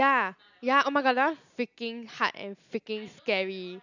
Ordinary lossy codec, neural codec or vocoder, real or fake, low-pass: none; none; real; 7.2 kHz